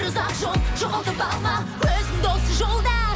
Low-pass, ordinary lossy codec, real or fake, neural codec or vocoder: none; none; real; none